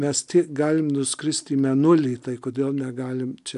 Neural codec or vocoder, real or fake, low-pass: none; real; 10.8 kHz